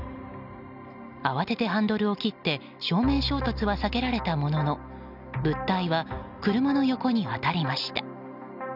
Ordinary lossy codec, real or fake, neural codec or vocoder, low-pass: none; real; none; 5.4 kHz